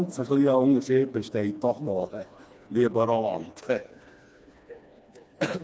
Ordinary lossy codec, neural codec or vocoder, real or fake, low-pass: none; codec, 16 kHz, 2 kbps, FreqCodec, smaller model; fake; none